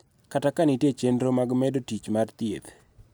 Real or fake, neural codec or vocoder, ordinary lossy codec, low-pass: real; none; none; none